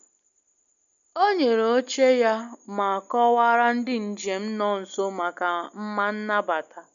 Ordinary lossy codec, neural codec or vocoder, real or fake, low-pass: MP3, 96 kbps; none; real; 7.2 kHz